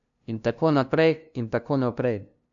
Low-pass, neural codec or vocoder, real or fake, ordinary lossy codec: 7.2 kHz; codec, 16 kHz, 0.5 kbps, FunCodec, trained on LibriTTS, 25 frames a second; fake; none